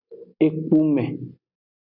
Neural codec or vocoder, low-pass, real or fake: none; 5.4 kHz; real